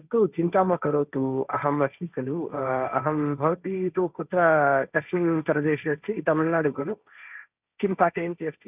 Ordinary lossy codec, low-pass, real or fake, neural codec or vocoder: none; 3.6 kHz; fake; codec, 16 kHz, 1.1 kbps, Voila-Tokenizer